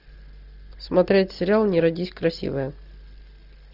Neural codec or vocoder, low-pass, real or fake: none; 5.4 kHz; real